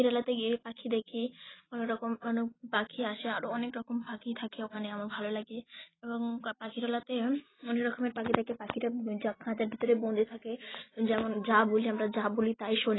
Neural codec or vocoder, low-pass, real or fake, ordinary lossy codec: none; 7.2 kHz; real; AAC, 16 kbps